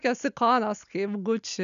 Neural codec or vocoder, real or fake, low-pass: none; real; 7.2 kHz